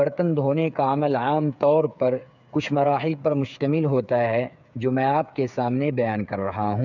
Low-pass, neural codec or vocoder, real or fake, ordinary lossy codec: 7.2 kHz; codec, 16 kHz, 8 kbps, FreqCodec, smaller model; fake; none